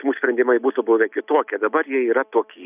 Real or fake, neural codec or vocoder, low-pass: real; none; 3.6 kHz